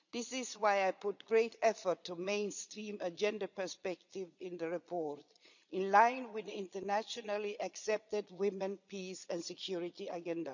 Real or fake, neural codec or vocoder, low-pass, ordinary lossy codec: fake; vocoder, 22.05 kHz, 80 mel bands, Vocos; 7.2 kHz; none